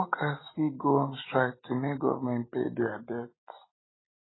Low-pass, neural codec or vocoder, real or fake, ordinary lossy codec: 7.2 kHz; none; real; AAC, 16 kbps